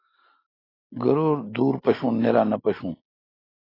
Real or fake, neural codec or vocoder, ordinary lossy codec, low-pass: real; none; AAC, 24 kbps; 5.4 kHz